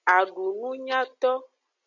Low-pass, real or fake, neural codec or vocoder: 7.2 kHz; real; none